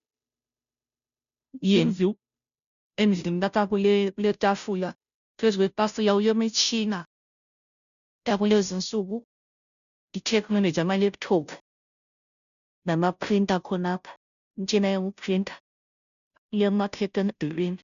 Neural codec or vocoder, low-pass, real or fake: codec, 16 kHz, 0.5 kbps, FunCodec, trained on Chinese and English, 25 frames a second; 7.2 kHz; fake